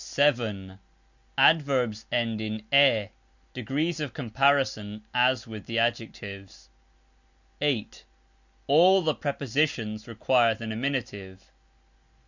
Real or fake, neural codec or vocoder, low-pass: real; none; 7.2 kHz